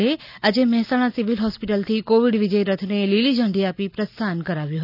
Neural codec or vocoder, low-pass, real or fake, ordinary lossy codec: none; 5.4 kHz; real; none